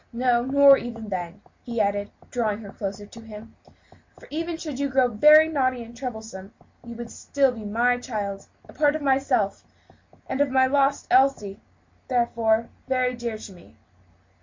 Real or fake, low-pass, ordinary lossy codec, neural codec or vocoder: real; 7.2 kHz; MP3, 48 kbps; none